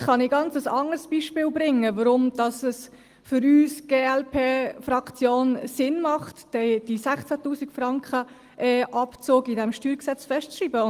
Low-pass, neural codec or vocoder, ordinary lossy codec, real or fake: 14.4 kHz; none; Opus, 24 kbps; real